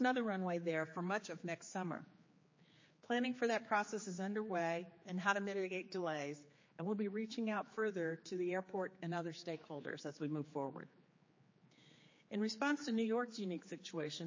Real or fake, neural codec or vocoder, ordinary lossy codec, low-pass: fake; codec, 16 kHz, 4 kbps, X-Codec, HuBERT features, trained on general audio; MP3, 32 kbps; 7.2 kHz